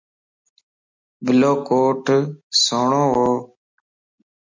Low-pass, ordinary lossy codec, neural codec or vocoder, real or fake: 7.2 kHz; MP3, 48 kbps; none; real